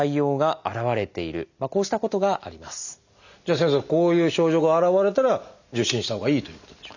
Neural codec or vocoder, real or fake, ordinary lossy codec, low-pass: none; real; none; 7.2 kHz